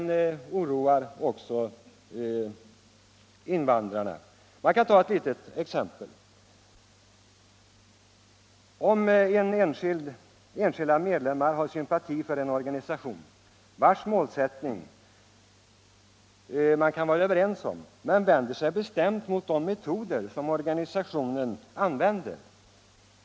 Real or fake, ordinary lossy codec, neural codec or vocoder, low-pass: real; none; none; none